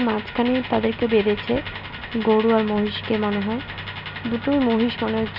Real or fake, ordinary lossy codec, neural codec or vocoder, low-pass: real; none; none; 5.4 kHz